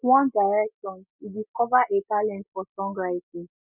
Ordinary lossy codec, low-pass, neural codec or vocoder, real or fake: none; 3.6 kHz; none; real